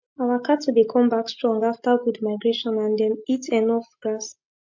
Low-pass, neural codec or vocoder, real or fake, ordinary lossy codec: 7.2 kHz; none; real; MP3, 48 kbps